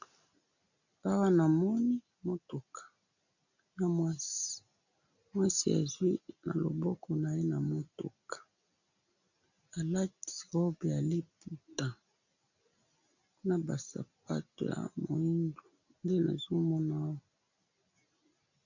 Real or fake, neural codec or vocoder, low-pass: real; none; 7.2 kHz